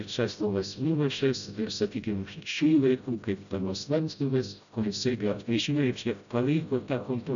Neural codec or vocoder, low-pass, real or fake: codec, 16 kHz, 0.5 kbps, FreqCodec, smaller model; 7.2 kHz; fake